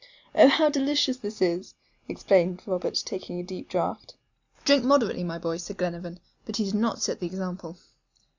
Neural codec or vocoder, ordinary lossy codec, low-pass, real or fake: none; Opus, 64 kbps; 7.2 kHz; real